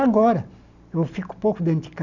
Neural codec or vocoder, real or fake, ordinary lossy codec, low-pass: none; real; none; 7.2 kHz